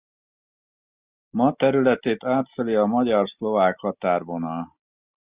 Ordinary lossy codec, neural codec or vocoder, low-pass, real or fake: Opus, 64 kbps; none; 3.6 kHz; real